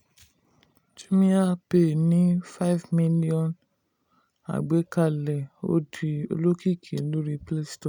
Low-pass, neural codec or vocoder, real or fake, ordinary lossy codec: 19.8 kHz; none; real; none